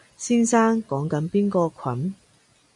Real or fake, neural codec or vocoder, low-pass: real; none; 10.8 kHz